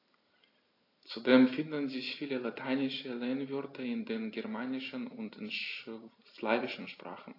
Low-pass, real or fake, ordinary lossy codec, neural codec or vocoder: 5.4 kHz; real; AAC, 32 kbps; none